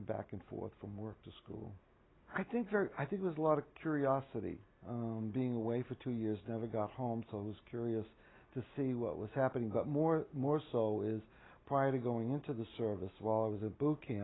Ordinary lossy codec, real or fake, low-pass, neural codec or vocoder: AAC, 16 kbps; real; 7.2 kHz; none